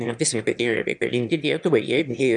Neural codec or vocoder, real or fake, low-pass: autoencoder, 22.05 kHz, a latent of 192 numbers a frame, VITS, trained on one speaker; fake; 9.9 kHz